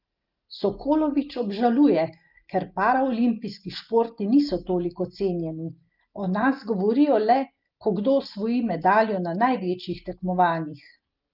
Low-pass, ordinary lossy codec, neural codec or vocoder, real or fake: 5.4 kHz; Opus, 24 kbps; none; real